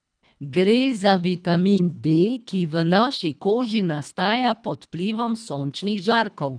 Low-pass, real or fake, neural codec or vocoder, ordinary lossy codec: 9.9 kHz; fake; codec, 24 kHz, 1.5 kbps, HILCodec; none